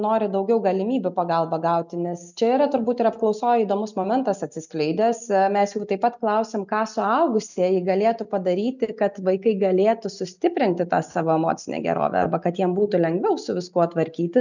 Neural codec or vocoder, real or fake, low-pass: none; real; 7.2 kHz